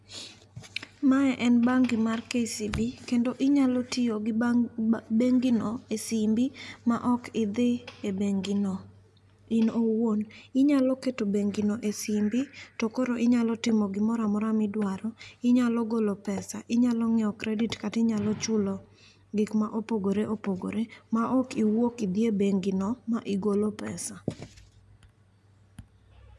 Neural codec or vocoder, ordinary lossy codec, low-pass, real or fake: none; none; none; real